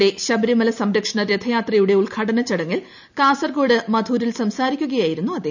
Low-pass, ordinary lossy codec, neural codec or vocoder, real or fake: 7.2 kHz; none; none; real